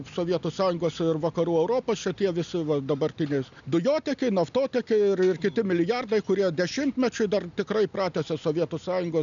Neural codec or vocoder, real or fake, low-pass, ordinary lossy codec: none; real; 7.2 kHz; MP3, 96 kbps